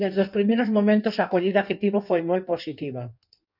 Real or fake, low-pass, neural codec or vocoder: fake; 5.4 kHz; codec, 16 kHz in and 24 kHz out, 1.1 kbps, FireRedTTS-2 codec